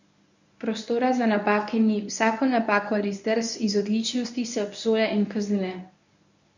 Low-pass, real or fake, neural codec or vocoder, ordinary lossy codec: 7.2 kHz; fake; codec, 24 kHz, 0.9 kbps, WavTokenizer, medium speech release version 1; none